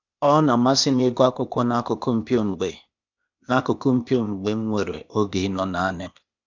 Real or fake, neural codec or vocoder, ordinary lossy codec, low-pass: fake; codec, 16 kHz, 0.8 kbps, ZipCodec; none; 7.2 kHz